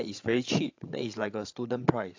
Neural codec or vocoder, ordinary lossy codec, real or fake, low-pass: codec, 16 kHz, 16 kbps, FreqCodec, larger model; AAC, 48 kbps; fake; 7.2 kHz